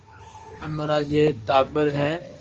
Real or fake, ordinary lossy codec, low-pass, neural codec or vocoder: fake; Opus, 24 kbps; 7.2 kHz; codec, 16 kHz, 1.1 kbps, Voila-Tokenizer